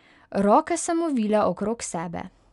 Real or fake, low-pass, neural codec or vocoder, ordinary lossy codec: real; 10.8 kHz; none; MP3, 96 kbps